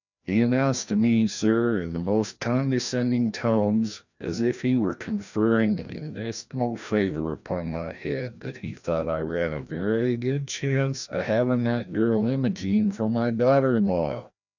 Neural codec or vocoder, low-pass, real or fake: codec, 16 kHz, 1 kbps, FreqCodec, larger model; 7.2 kHz; fake